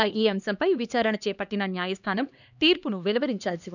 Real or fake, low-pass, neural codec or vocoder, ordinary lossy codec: fake; 7.2 kHz; codec, 16 kHz, 2 kbps, X-Codec, HuBERT features, trained on LibriSpeech; none